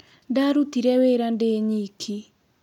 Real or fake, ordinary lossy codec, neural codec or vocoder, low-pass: real; none; none; 19.8 kHz